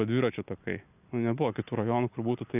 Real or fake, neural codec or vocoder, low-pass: real; none; 3.6 kHz